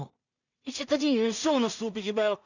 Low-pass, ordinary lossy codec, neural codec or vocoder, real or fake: 7.2 kHz; none; codec, 16 kHz in and 24 kHz out, 0.4 kbps, LongCat-Audio-Codec, two codebook decoder; fake